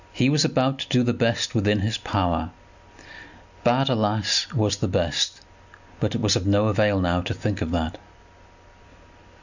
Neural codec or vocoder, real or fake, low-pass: none; real; 7.2 kHz